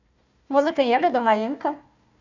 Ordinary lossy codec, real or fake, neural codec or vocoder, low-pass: none; fake; codec, 16 kHz, 1 kbps, FunCodec, trained on Chinese and English, 50 frames a second; 7.2 kHz